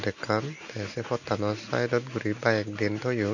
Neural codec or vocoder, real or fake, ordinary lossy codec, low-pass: none; real; none; 7.2 kHz